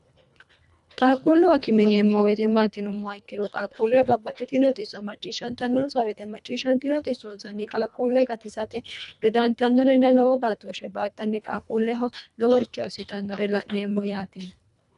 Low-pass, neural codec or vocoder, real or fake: 10.8 kHz; codec, 24 kHz, 1.5 kbps, HILCodec; fake